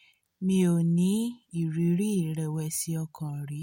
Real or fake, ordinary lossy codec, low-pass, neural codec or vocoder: real; MP3, 64 kbps; 19.8 kHz; none